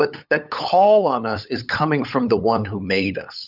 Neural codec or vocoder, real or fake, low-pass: codec, 16 kHz, 8 kbps, FunCodec, trained on LibriTTS, 25 frames a second; fake; 5.4 kHz